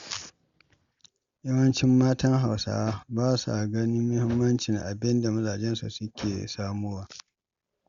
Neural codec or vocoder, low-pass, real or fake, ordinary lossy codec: none; 7.2 kHz; real; Opus, 64 kbps